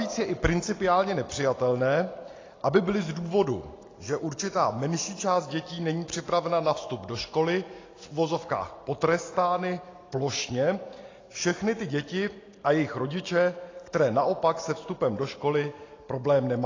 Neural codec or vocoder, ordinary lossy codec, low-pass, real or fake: none; AAC, 32 kbps; 7.2 kHz; real